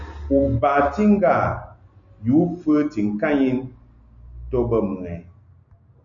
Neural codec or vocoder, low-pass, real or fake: none; 7.2 kHz; real